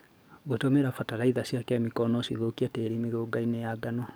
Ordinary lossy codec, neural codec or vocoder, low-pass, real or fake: none; codec, 44.1 kHz, 7.8 kbps, DAC; none; fake